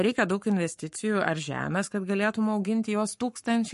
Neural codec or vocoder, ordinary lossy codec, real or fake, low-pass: codec, 44.1 kHz, 7.8 kbps, Pupu-Codec; MP3, 48 kbps; fake; 14.4 kHz